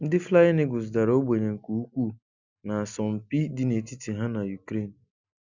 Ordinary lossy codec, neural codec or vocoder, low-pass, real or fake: none; none; 7.2 kHz; real